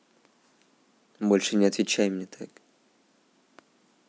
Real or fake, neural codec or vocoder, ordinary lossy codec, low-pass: real; none; none; none